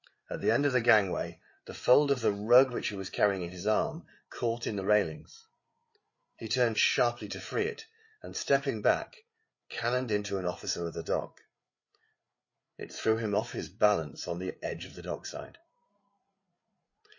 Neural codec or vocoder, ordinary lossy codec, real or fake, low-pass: codec, 16 kHz, 8 kbps, FreqCodec, larger model; MP3, 32 kbps; fake; 7.2 kHz